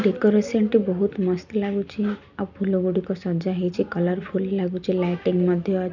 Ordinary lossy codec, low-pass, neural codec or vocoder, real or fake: none; 7.2 kHz; none; real